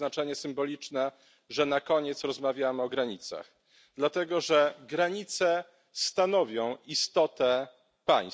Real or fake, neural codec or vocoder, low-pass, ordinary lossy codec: real; none; none; none